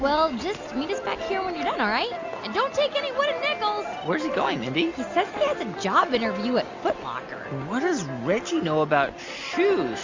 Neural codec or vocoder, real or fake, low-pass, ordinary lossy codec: none; real; 7.2 kHz; MP3, 48 kbps